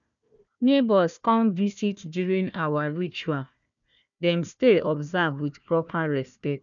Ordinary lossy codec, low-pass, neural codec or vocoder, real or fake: none; 7.2 kHz; codec, 16 kHz, 1 kbps, FunCodec, trained on Chinese and English, 50 frames a second; fake